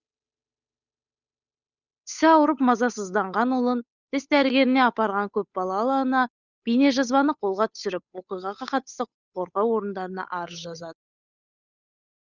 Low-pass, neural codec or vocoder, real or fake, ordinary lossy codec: 7.2 kHz; codec, 16 kHz, 8 kbps, FunCodec, trained on Chinese and English, 25 frames a second; fake; none